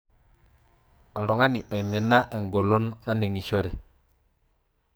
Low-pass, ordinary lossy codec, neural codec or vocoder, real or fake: none; none; codec, 44.1 kHz, 2.6 kbps, SNAC; fake